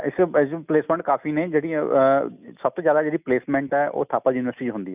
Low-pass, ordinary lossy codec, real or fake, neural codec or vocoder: 3.6 kHz; none; real; none